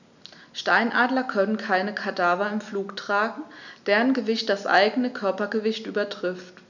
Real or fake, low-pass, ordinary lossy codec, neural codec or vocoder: real; 7.2 kHz; none; none